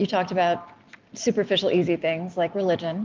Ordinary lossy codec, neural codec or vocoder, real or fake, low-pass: Opus, 32 kbps; none; real; 7.2 kHz